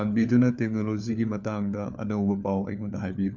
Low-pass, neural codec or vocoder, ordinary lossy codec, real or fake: 7.2 kHz; codec, 16 kHz, 4 kbps, FunCodec, trained on LibriTTS, 50 frames a second; none; fake